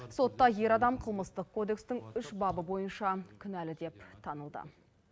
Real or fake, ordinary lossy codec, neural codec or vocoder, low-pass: real; none; none; none